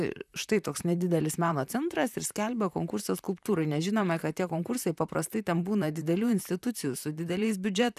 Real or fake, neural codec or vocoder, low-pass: fake; vocoder, 44.1 kHz, 128 mel bands, Pupu-Vocoder; 14.4 kHz